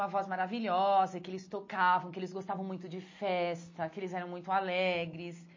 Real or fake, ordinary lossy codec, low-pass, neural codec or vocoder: real; MP3, 32 kbps; 7.2 kHz; none